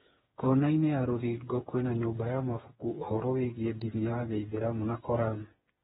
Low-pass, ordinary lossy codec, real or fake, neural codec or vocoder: 7.2 kHz; AAC, 16 kbps; fake; codec, 16 kHz, 4 kbps, FreqCodec, smaller model